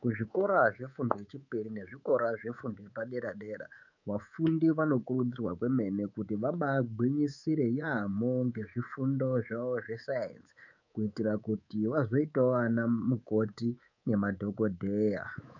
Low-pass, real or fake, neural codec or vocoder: 7.2 kHz; fake; codec, 24 kHz, 3.1 kbps, DualCodec